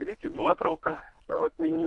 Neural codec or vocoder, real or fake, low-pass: codec, 24 kHz, 1.5 kbps, HILCodec; fake; 10.8 kHz